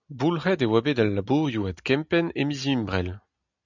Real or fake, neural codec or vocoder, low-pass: real; none; 7.2 kHz